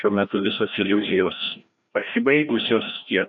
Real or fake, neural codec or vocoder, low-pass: fake; codec, 16 kHz, 1 kbps, FreqCodec, larger model; 7.2 kHz